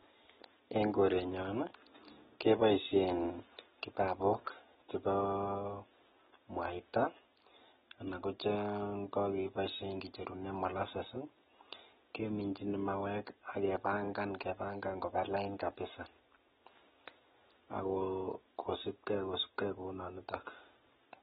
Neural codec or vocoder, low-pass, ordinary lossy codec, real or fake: none; 7.2 kHz; AAC, 16 kbps; real